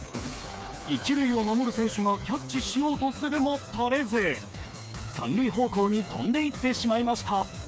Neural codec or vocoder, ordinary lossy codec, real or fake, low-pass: codec, 16 kHz, 4 kbps, FreqCodec, smaller model; none; fake; none